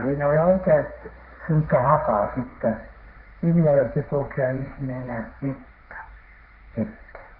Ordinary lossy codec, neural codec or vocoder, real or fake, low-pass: none; codec, 16 kHz, 1.1 kbps, Voila-Tokenizer; fake; 5.4 kHz